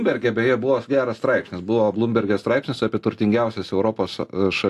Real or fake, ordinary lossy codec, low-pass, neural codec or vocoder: real; AAC, 64 kbps; 14.4 kHz; none